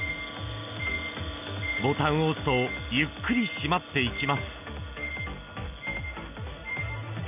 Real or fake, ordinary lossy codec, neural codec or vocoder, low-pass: real; none; none; 3.6 kHz